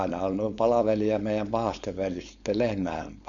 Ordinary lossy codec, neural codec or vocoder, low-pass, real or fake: none; codec, 16 kHz, 4.8 kbps, FACodec; 7.2 kHz; fake